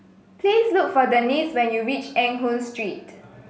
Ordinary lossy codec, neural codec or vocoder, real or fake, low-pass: none; none; real; none